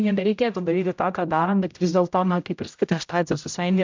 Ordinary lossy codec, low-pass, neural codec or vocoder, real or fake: MP3, 64 kbps; 7.2 kHz; codec, 16 kHz, 0.5 kbps, X-Codec, HuBERT features, trained on general audio; fake